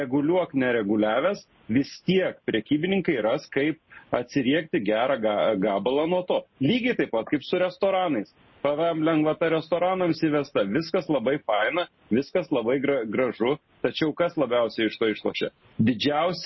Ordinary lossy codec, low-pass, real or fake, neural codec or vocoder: MP3, 24 kbps; 7.2 kHz; real; none